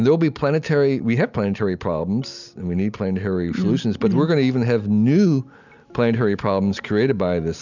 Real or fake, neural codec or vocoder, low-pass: real; none; 7.2 kHz